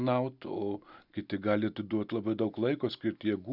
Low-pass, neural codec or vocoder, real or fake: 5.4 kHz; none; real